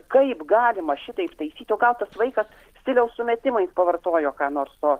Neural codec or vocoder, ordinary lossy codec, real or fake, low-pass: none; Opus, 16 kbps; real; 14.4 kHz